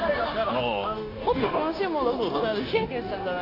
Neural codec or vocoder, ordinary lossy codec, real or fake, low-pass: codec, 16 kHz, 0.9 kbps, LongCat-Audio-Codec; AAC, 32 kbps; fake; 5.4 kHz